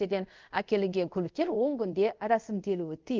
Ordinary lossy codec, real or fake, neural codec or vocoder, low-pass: Opus, 32 kbps; fake; codec, 24 kHz, 0.5 kbps, DualCodec; 7.2 kHz